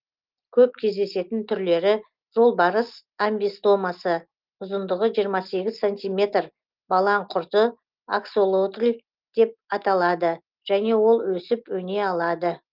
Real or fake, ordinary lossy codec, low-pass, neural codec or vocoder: real; Opus, 32 kbps; 5.4 kHz; none